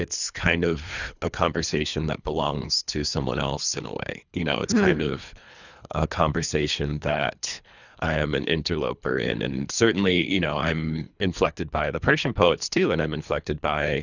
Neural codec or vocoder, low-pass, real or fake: codec, 24 kHz, 3 kbps, HILCodec; 7.2 kHz; fake